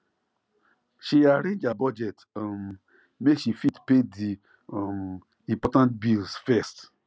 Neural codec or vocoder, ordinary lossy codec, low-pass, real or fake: none; none; none; real